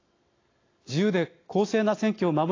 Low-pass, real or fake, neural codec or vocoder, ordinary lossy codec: 7.2 kHz; real; none; AAC, 32 kbps